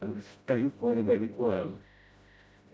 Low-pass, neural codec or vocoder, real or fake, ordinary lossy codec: none; codec, 16 kHz, 0.5 kbps, FreqCodec, smaller model; fake; none